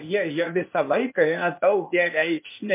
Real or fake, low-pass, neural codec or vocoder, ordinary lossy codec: fake; 3.6 kHz; codec, 16 kHz, 0.8 kbps, ZipCodec; MP3, 24 kbps